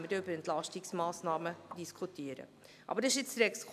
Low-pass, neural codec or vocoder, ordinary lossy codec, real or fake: 14.4 kHz; none; none; real